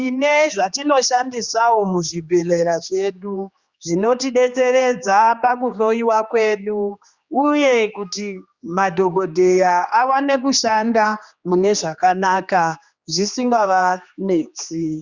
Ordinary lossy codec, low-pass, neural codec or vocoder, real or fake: Opus, 64 kbps; 7.2 kHz; codec, 16 kHz, 2 kbps, X-Codec, HuBERT features, trained on general audio; fake